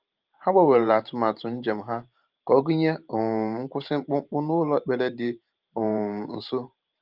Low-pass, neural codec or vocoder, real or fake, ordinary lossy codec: 5.4 kHz; vocoder, 44.1 kHz, 128 mel bands every 512 samples, BigVGAN v2; fake; Opus, 32 kbps